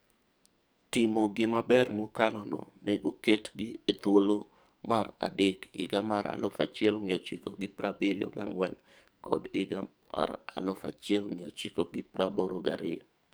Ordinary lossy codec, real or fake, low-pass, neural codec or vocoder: none; fake; none; codec, 44.1 kHz, 2.6 kbps, SNAC